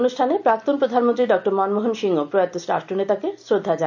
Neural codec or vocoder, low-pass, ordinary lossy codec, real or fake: none; 7.2 kHz; none; real